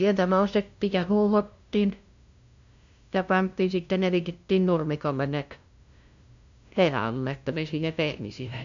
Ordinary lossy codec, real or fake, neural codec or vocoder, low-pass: AAC, 64 kbps; fake; codec, 16 kHz, 0.5 kbps, FunCodec, trained on LibriTTS, 25 frames a second; 7.2 kHz